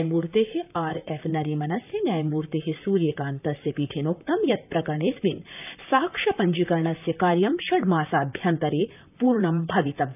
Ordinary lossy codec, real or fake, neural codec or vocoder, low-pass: none; fake; vocoder, 44.1 kHz, 128 mel bands, Pupu-Vocoder; 3.6 kHz